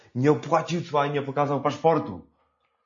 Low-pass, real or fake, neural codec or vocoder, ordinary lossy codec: 7.2 kHz; real; none; MP3, 32 kbps